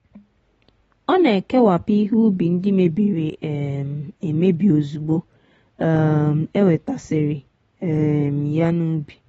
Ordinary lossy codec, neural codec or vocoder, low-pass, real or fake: AAC, 24 kbps; none; 9.9 kHz; real